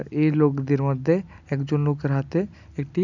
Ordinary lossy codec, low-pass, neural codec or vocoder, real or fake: none; 7.2 kHz; none; real